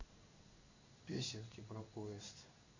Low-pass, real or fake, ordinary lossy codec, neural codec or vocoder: 7.2 kHz; fake; Opus, 64 kbps; codec, 16 kHz in and 24 kHz out, 1 kbps, XY-Tokenizer